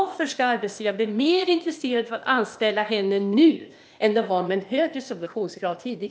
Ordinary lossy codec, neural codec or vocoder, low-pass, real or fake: none; codec, 16 kHz, 0.8 kbps, ZipCodec; none; fake